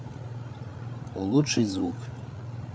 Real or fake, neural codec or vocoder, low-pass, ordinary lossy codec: fake; codec, 16 kHz, 8 kbps, FreqCodec, larger model; none; none